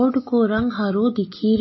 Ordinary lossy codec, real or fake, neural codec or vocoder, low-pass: MP3, 24 kbps; real; none; 7.2 kHz